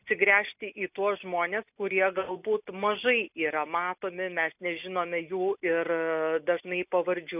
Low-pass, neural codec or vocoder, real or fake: 3.6 kHz; none; real